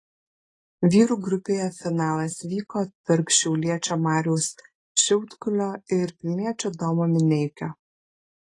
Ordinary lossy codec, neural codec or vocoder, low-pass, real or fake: AAC, 32 kbps; none; 10.8 kHz; real